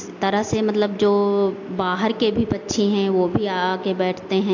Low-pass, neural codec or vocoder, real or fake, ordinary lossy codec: 7.2 kHz; none; real; AAC, 48 kbps